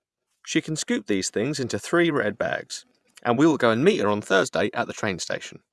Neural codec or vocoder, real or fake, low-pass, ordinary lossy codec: vocoder, 24 kHz, 100 mel bands, Vocos; fake; none; none